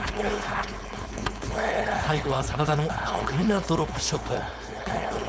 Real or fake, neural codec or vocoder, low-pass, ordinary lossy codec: fake; codec, 16 kHz, 4.8 kbps, FACodec; none; none